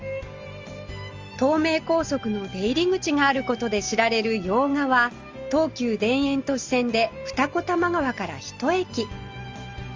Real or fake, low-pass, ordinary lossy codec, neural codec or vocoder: real; 7.2 kHz; Opus, 32 kbps; none